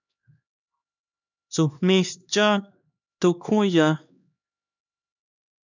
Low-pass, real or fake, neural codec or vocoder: 7.2 kHz; fake; codec, 16 kHz, 2 kbps, X-Codec, HuBERT features, trained on LibriSpeech